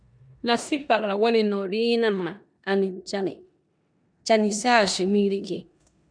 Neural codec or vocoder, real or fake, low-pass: codec, 16 kHz in and 24 kHz out, 0.9 kbps, LongCat-Audio-Codec, four codebook decoder; fake; 9.9 kHz